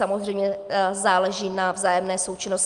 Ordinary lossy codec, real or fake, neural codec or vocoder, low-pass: Opus, 32 kbps; real; none; 10.8 kHz